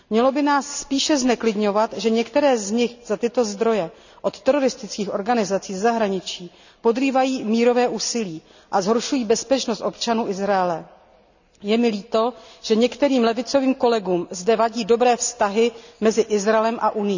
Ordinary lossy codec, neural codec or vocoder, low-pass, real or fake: none; none; 7.2 kHz; real